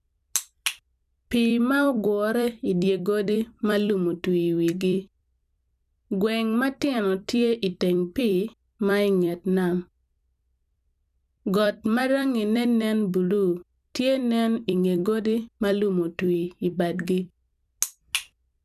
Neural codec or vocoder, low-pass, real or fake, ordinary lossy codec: vocoder, 44.1 kHz, 128 mel bands every 256 samples, BigVGAN v2; 14.4 kHz; fake; none